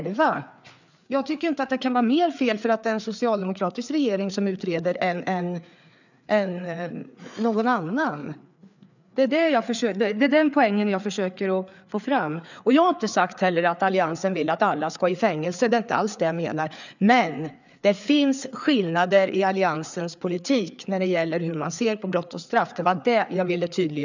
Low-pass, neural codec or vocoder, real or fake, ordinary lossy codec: 7.2 kHz; codec, 16 kHz, 4 kbps, FreqCodec, larger model; fake; none